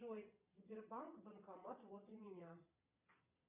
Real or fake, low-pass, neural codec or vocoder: fake; 3.6 kHz; vocoder, 22.05 kHz, 80 mel bands, Vocos